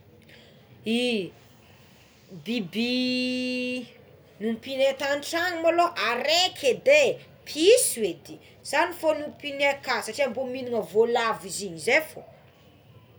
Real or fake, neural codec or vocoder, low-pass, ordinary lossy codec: real; none; none; none